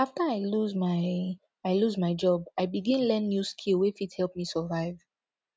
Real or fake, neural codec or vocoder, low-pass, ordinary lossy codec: real; none; none; none